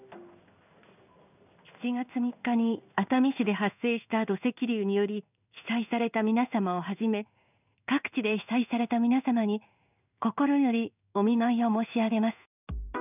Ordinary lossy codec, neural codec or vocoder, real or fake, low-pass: none; codec, 16 kHz in and 24 kHz out, 1 kbps, XY-Tokenizer; fake; 3.6 kHz